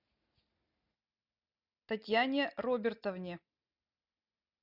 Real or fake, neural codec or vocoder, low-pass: real; none; 5.4 kHz